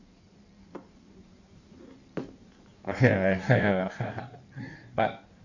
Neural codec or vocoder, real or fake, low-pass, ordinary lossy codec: codec, 16 kHz in and 24 kHz out, 1.1 kbps, FireRedTTS-2 codec; fake; 7.2 kHz; none